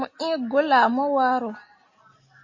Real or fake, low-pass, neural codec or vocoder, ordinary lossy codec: real; 7.2 kHz; none; MP3, 32 kbps